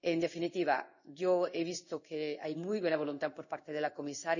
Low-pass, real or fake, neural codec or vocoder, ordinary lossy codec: 7.2 kHz; fake; codec, 16 kHz in and 24 kHz out, 1 kbps, XY-Tokenizer; none